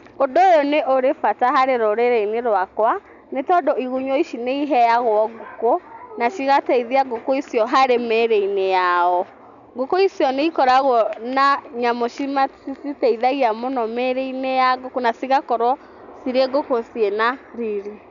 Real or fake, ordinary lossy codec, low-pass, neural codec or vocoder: real; none; 7.2 kHz; none